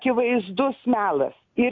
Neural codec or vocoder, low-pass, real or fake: none; 7.2 kHz; real